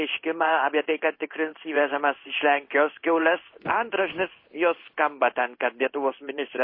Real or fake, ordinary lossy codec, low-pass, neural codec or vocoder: fake; MP3, 24 kbps; 5.4 kHz; codec, 16 kHz in and 24 kHz out, 1 kbps, XY-Tokenizer